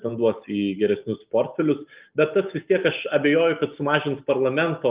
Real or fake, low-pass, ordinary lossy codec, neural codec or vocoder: real; 3.6 kHz; Opus, 32 kbps; none